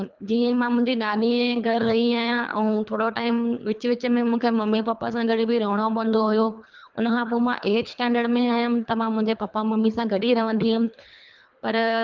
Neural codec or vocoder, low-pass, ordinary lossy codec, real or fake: codec, 24 kHz, 3 kbps, HILCodec; 7.2 kHz; Opus, 24 kbps; fake